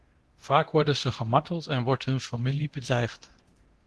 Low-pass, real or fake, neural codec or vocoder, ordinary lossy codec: 10.8 kHz; fake; codec, 24 kHz, 0.9 kbps, DualCodec; Opus, 16 kbps